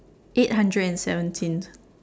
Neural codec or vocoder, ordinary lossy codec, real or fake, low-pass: none; none; real; none